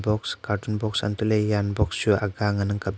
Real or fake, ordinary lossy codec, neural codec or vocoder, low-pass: real; none; none; none